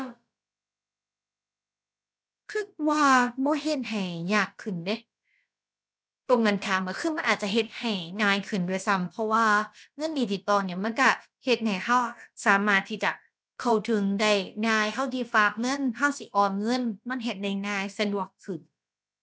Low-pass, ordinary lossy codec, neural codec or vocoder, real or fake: none; none; codec, 16 kHz, about 1 kbps, DyCAST, with the encoder's durations; fake